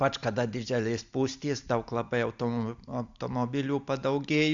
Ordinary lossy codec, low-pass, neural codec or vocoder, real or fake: Opus, 64 kbps; 7.2 kHz; none; real